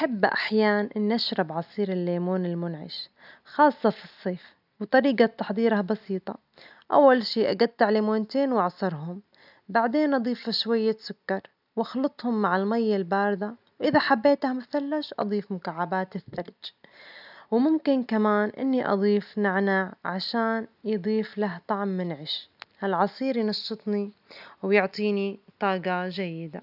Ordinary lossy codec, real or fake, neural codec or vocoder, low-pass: none; real; none; 5.4 kHz